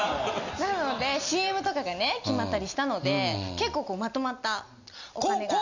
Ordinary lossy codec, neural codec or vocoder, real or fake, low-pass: none; none; real; 7.2 kHz